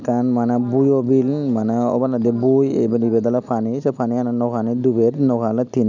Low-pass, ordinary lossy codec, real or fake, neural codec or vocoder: 7.2 kHz; none; real; none